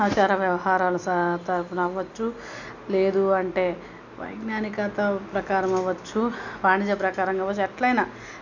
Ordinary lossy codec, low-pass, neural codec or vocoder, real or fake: none; 7.2 kHz; none; real